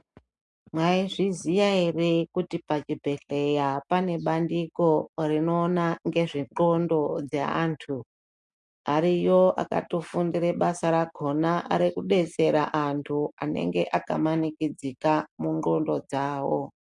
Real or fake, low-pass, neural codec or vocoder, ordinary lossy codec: real; 10.8 kHz; none; MP3, 64 kbps